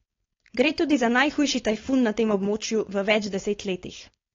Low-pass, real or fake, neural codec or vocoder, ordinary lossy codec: 7.2 kHz; fake; codec, 16 kHz, 4.8 kbps, FACodec; AAC, 32 kbps